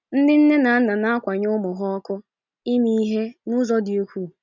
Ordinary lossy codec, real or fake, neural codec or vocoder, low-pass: none; real; none; 7.2 kHz